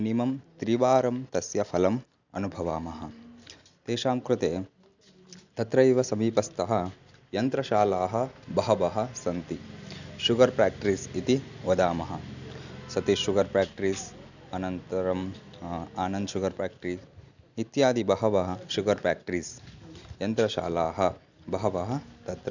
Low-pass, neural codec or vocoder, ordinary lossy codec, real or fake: 7.2 kHz; none; none; real